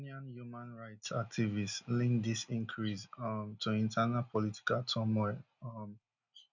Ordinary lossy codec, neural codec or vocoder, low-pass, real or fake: none; none; 7.2 kHz; real